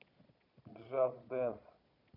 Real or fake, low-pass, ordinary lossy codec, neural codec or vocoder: fake; 5.4 kHz; none; vocoder, 22.05 kHz, 80 mel bands, Vocos